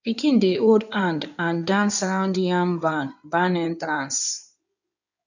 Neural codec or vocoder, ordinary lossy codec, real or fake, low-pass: codec, 16 kHz in and 24 kHz out, 2.2 kbps, FireRedTTS-2 codec; none; fake; 7.2 kHz